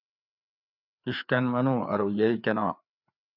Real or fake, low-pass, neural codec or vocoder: fake; 5.4 kHz; codec, 16 kHz, 4 kbps, FreqCodec, larger model